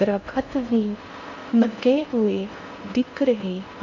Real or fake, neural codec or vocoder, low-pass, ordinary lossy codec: fake; codec, 16 kHz in and 24 kHz out, 0.6 kbps, FocalCodec, streaming, 4096 codes; 7.2 kHz; none